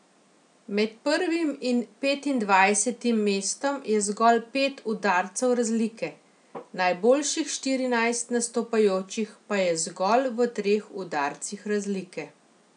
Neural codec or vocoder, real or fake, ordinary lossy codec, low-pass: none; real; none; 9.9 kHz